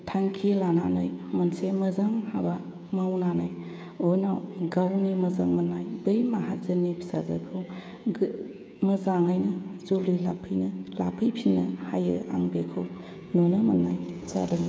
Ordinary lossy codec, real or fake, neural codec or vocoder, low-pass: none; fake; codec, 16 kHz, 16 kbps, FreqCodec, smaller model; none